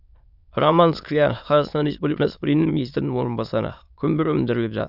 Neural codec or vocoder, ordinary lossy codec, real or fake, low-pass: autoencoder, 22.05 kHz, a latent of 192 numbers a frame, VITS, trained on many speakers; none; fake; 5.4 kHz